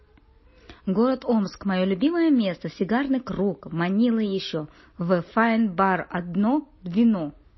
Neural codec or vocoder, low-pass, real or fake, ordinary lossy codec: none; 7.2 kHz; real; MP3, 24 kbps